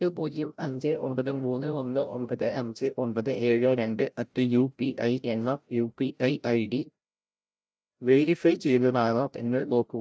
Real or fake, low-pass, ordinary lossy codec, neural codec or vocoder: fake; none; none; codec, 16 kHz, 0.5 kbps, FreqCodec, larger model